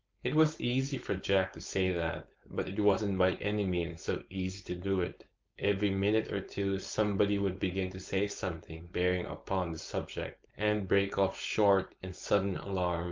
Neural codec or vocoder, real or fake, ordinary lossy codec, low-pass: codec, 16 kHz, 4.8 kbps, FACodec; fake; Opus, 24 kbps; 7.2 kHz